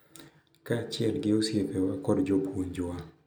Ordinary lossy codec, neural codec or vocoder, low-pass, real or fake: none; none; none; real